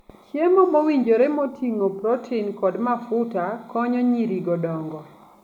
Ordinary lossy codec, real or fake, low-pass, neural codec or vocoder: none; real; 19.8 kHz; none